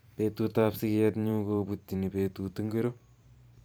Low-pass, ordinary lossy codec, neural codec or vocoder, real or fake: none; none; vocoder, 44.1 kHz, 128 mel bands every 512 samples, BigVGAN v2; fake